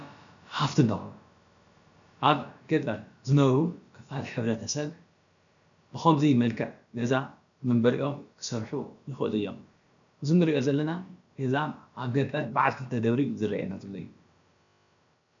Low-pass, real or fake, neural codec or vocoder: 7.2 kHz; fake; codec, 16 kHz, about 1 kbps, DyCAST, with the encoder's durations